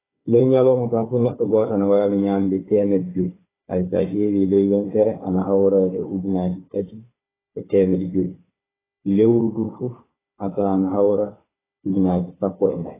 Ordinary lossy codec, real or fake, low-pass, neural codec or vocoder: AAC, 16 kbps; fake; 3.6 kHz; codec, 16 kHz, 4 kbps, FunCodec, trained on Chinese and English, 50 frames a second